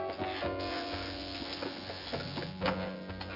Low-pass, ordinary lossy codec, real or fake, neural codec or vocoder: 5.4 kHz; none; fake; vocoder, 24 kHz, 100 mel bands, Vocos